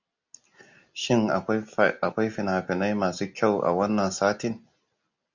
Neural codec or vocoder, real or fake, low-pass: none; real; 7.2 kHz